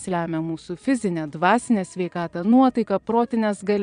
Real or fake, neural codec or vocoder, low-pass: fake; vocoder, 22.05 kHz, 80 mel bands, Vocos; 9.9 kHz